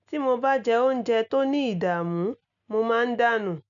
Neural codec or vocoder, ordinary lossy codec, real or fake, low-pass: none; none; real; 7.2 kHz